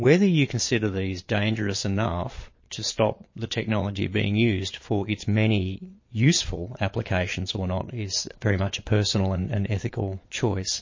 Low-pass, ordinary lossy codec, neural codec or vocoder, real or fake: 7.2 kHz; MP3, 32 kbps; vocoder, 22.05 kHz, 80 mel bands, Vocos; fake